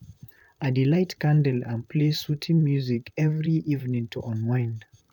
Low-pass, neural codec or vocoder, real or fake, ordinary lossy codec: 19.8 kHz; vocoder, 44.1 kHz, 128 mel bands, Pupu-Vocoder; fake; none